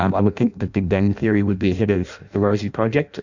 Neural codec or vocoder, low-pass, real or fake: codec, 16 kHz in and 24 kHz out, 0.6 kbps, FireRedTTS-2 codec; 7.2 kHz; fake